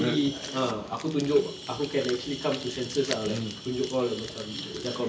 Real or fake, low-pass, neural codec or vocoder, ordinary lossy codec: real; none; none; none